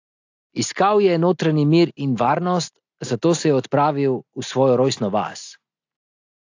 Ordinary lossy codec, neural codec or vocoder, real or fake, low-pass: AAC, 48 kbps; none; real; 7.2 kHz